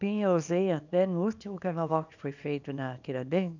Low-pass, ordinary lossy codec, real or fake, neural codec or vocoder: 7.2 kHz; none; fake; codec, 24 kHz, 0.9 kbps, WavTokenizer, small release